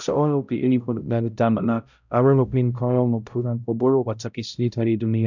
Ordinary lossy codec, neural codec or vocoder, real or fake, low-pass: none; codec, 16 kHz, 0.5 kbps, X-Codec, HuBERT features, trained on balanced general audio; fake; 7.2 kHz